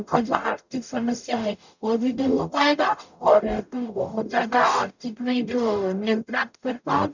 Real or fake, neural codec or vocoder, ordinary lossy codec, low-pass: fake; codec, 44.1 kHz, 0.9 kbps, DAC; none; 7.2 kHz